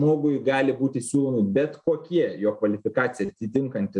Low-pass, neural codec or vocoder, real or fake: 10.8 kHz; none; real